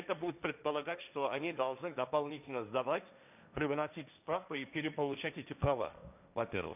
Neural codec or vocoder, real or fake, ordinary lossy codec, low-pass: codec, 16 kHz, 1.1 kbps, Voila-Tokenizer; fake; none; 3.6 kHz